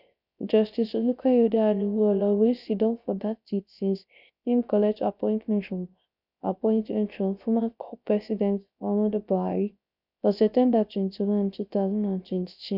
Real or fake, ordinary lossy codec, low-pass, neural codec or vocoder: fake; none; 5.4 kHz; codec, 16 kHz, 0.3 kbps, FocalCodec